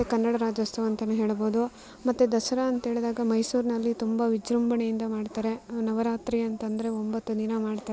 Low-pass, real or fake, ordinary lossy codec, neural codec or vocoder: none; real; none; none